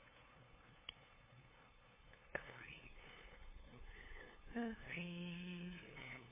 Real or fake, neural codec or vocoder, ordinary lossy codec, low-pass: fake; codec, 16 kHz, 2 kbps, FunCodec, trained on LibriTTS, 25 frames a second; MP3, 16 kbps; 3.6 kHz